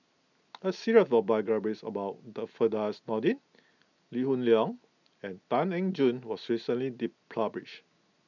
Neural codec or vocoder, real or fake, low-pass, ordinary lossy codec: none; real; 7.2 kHz; none